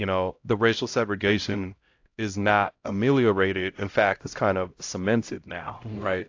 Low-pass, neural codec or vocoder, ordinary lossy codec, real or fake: 7.2 kHz; codec, 16 kHz, 0.5 kbps, X-Codec, HuBERT features, trained on LibriSpeech; AAC, 48 kbps; fake